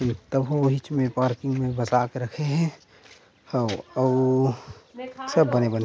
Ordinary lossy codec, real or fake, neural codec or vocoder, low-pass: none; real; none; none